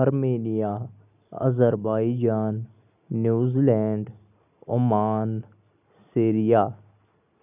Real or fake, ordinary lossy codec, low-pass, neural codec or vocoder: real; none; 3.6 kHz; none